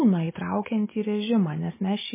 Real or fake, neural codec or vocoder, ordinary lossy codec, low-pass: real; none; MP3, 16 kbps; 3.6 kHz